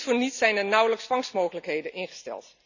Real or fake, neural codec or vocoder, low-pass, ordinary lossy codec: real; none; 7.2 kHz; none